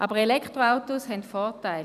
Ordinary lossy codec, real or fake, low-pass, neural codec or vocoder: none; fake; 14.4 kHz; vocoder, 44.1 kHz, 128 mel bands every 256 samples, BigVGAN v2